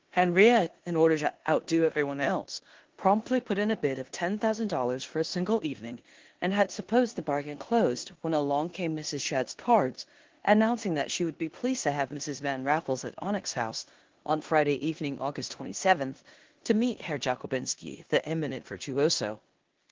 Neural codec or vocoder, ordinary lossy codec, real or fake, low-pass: codec, 16 kHz in and 24 kHz out, 0.9 kbps, LongCat-Audio-Codec, four codebook decoder; Opus, 16 kbps; fake; 7.2 kHz